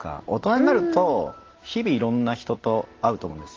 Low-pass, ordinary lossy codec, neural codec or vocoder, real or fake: 7.2 kHz; Opus, 16 kbps; none; real